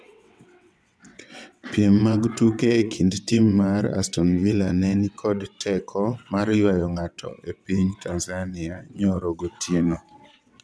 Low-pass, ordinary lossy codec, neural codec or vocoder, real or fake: none; none; vocoder, 22.05 kHz, 80 mel bands, WaveNeXt; fake